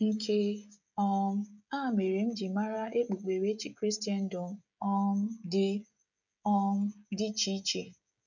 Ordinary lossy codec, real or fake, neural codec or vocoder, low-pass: none; fake; codec, 16 kHz, 16 kbps, FreqCodec, smaller model; 7.2 kHz